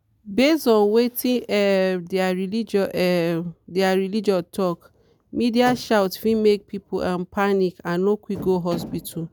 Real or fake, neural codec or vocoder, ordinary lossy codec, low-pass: real; none; none; none